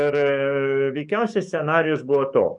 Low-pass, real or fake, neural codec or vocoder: 10.8 kHz; fake; codec, 44.1 kHz, 7.8 kbps, DAC